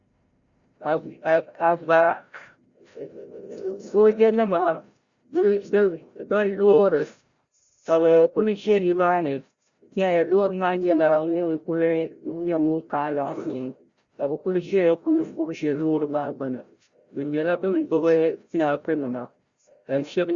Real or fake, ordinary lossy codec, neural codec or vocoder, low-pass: fake; Opus, 64 kbps; codec, 16 kHz, 0.5 kbps, FreqCodec, larger model; 7.2 kHz